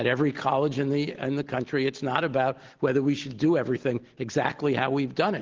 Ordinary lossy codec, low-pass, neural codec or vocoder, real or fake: Opus, 16 kbps; 7.2 kHz; none; real